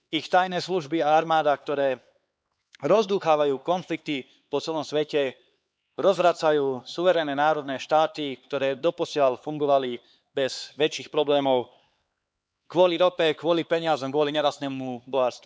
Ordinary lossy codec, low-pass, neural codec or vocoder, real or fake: none; none; codec, 16 kHz, 4 kbps, X-Codec, HuBERT features, trained on LibriSpeech; fake